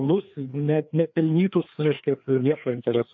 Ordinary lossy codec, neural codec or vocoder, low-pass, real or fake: AAC, 48 kbps; codec, 16 kHz, 2 kbps, FreqCodec, larger model; 7.2 kHz; fake